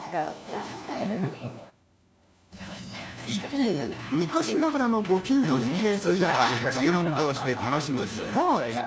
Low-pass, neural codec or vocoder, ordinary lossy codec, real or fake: none; codec, 16 kHz, 1 kbps, FunCodec, trained on LibriTTS, 50 frames a second; none; fake